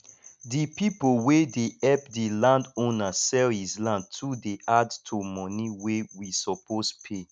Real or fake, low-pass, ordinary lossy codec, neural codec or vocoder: real; 9.9 kHz; none; none